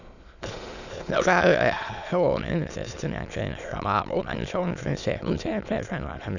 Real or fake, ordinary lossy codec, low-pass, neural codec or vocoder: fake; none; 7.2 kHz; autoencoder, 22.05 kHz, a latent of 192 numbers a frame, VITS, trained on many speakers